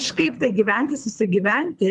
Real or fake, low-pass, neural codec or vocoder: fake; 10.8 kHz; codec, 24 kHz, 3 kbps, HILCodec